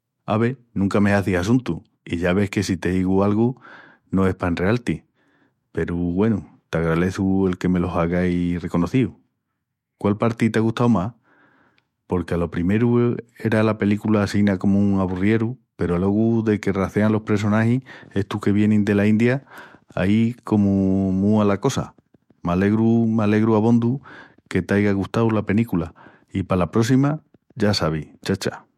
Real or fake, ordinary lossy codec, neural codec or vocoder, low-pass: fake; MP3, 64 kbps; autoencoder, 48 kHz, 128 numbers a frame, DAC-VAE, trained on Japanese speech; 19.8 kHz